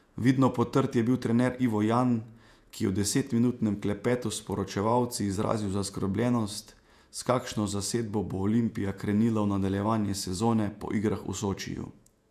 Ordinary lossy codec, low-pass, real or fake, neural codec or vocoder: none; 14.4 kHz; fake; vocoder, 48 kHz, 128 mel bands, Vocos